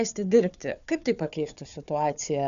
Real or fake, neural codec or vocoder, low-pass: fake; codec, 16 kHz, 4 kbps, FunCodec, trained on Chinese and English, 50 frames a second; 7.2 kHz